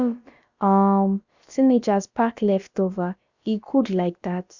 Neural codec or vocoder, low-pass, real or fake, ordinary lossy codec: codec, 16 kHz, about 1 kbps, DyCAST, with the encoder's durations; 7.2 kHz; fake; Opus, 64 kbps